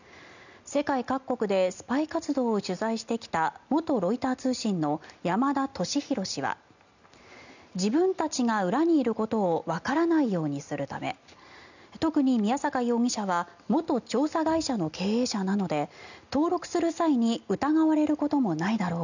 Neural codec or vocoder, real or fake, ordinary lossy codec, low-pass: none; real; none; 7.2 kHz